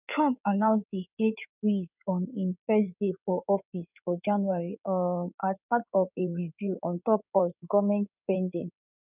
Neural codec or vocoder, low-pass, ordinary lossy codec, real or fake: codec, 16 kHz in and 24 kHz out, 2.2 kbps, FireRedTTS-2 codec; 3.6 kHz; none; fake